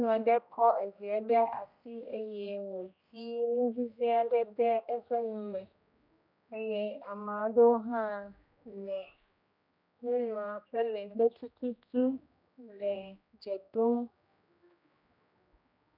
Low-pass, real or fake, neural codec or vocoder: 5.4 kHz; fake; codec, 16 kHz, 1 kbps, X-Codec, HuBERT features, trained on general audio